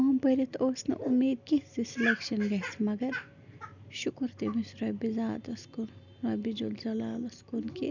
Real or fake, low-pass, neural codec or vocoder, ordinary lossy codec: real; 7.2 kHz; none; none